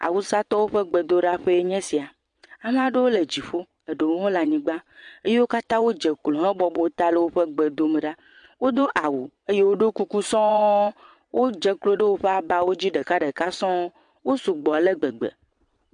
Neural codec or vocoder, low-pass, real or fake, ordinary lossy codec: vocoder, 22.05 kHz, 80 mel bands, Vocos; 9.9 kHz; fake; MP3, 64 kbps